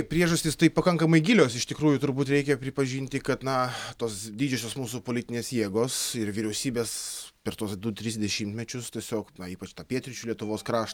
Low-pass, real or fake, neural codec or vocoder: 19.8 kHz; real; none